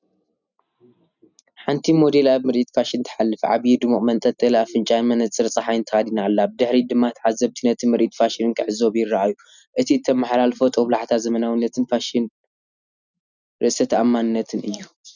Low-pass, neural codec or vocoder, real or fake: 7.2 kHz; none; real